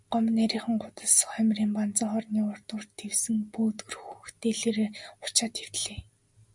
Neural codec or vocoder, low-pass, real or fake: none; 10.8 kHz; real